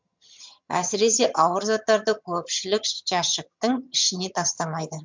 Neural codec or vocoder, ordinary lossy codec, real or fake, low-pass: vocoder, 22.05 kHz, 80 mel bands, HiFi-GAN; MP3, 64 kbps; fake; 7.2 kHz